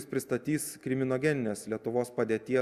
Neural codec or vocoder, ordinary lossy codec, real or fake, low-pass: none; Opus, 64 kbps; real; 14.4 kHz